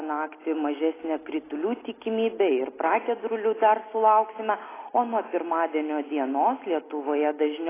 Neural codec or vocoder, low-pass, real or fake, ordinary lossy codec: none; 3.6 kHz; real; AAC, 16 kbps